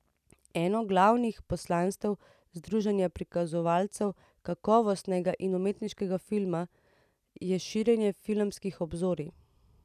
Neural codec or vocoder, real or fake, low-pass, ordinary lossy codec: none; real; 14.4 kHz; none